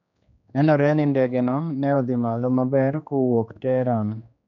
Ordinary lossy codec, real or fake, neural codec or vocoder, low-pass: none; fake; codec, 16 kHz, 2 kbps, X-Codec, HuBERT features, trained on general audio; 7.2 kHz